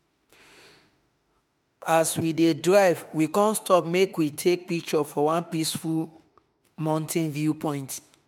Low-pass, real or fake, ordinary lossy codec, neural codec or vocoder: 19.8 kHz; fake; MP3, 96 kbps; autoencoder, 48 kHz, 32 numbers a frame, DAC-VAE, trained on Japanese speech